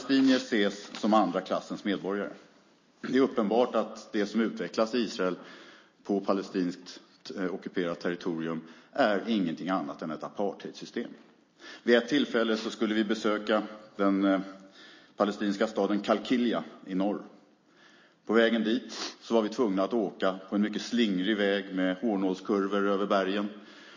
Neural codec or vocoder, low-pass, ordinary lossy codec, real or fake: none; 7.2 kHz; MP3, 32 kbps; real